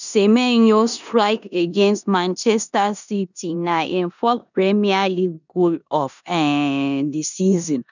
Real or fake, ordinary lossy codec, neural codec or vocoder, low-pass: fake; none; codec, 16 kHz in and 24 kHz out, 0.9 kbps, LongCat-Audio-Codec, four codebook decoder; 7.2 kHz